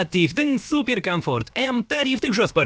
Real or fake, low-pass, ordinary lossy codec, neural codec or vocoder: fake; none; none; codec, 16 kHz, about 1 kbps, DyCAST, with the encoder's durations